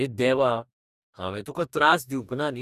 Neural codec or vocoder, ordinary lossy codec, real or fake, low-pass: codec, 44.1 kHz, 2.6 kbps, DAC; none; fake; 14.4 kHz